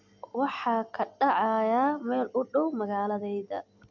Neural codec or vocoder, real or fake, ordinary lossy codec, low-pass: none; real; none; 7.2 kHz